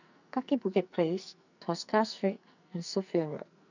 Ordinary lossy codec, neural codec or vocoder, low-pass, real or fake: none; codec, 44.1 kHz, 2.6 kbps, SNAC; 7.2 kHz; fake